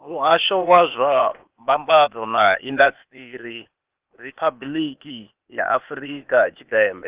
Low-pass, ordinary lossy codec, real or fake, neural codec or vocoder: 3.6 kHz; Opus, 32 kbps; fake; codec, 16 kHz, 0.8 kbps, ZipCodec